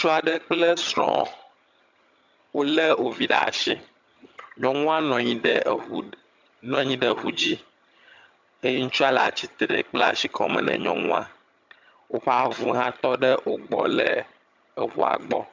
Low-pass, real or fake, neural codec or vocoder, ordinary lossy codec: 7.2 kHz; fake; vocoder, 22.05 kHz, 80 mel bands, HiFi-GAN; MP3, 64 kbps